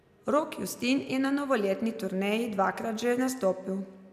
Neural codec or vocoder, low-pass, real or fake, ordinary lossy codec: none; 14.4 kHz; real; none